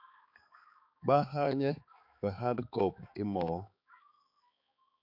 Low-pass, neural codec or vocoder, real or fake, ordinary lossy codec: 5.4 kHz; codec, 16 kHz, 4 kbps, X-Codec, HuBERT features, trained on balanced general audio; fake; AAC, 48 kbps